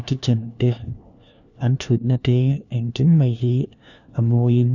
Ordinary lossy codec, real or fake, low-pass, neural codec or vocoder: none; fake; 7.2 kHz; codec, 16 kHz, 1 kbps, FunCodec, trained on LibriTTS, 50 frames a second